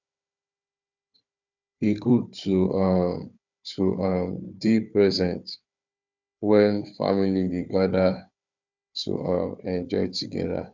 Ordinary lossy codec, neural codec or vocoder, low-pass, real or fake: none; codec, 16 kHz, 4 kbps, FunCodec, trained on Chinese and English, 50 frames a second; 7.2 kHz; fake